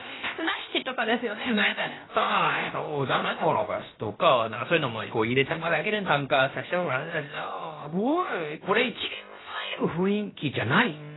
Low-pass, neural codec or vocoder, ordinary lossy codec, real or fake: 7.2 kHz; codec, 16 kHz, about 1 kbps, DyCAST, with the encoder's durations; AAC, 16 kbps; fake